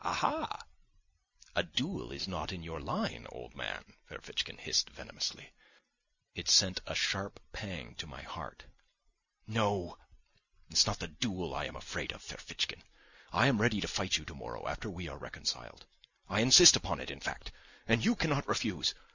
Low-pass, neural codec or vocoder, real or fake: 7.2 kHz; none; real